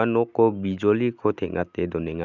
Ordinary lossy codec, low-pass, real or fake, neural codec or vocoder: none; 7.2 kHz; real; none